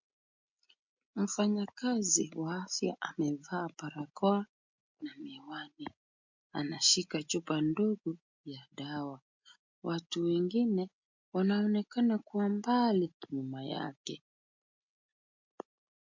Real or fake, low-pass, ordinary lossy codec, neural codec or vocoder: real; 7.2 kHz; MP3, 48 kbps; none